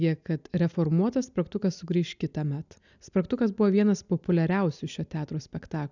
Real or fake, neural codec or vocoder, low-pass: real; none; 7.2 kHz